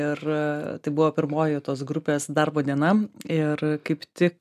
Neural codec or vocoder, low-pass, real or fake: none; 14.4 kHz; real